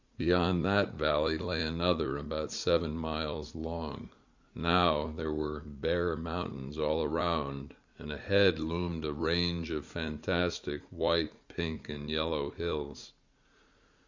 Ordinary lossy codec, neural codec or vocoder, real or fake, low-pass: Opus, 64 kbps; vocoder, 44.1 kHz, 80 mel bands, Vocos; fake; 7.2 kHz